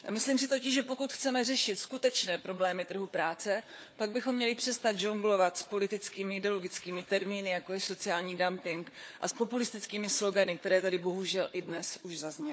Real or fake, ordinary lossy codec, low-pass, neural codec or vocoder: fake; none; none; codec, 16 kHz, 4 kbps, FunCodec, trained on Chinese and English, 50 frames a second